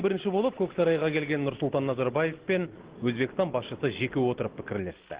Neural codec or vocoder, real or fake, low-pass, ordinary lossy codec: none; real; 3.6 kHz; Opus, 16 kbps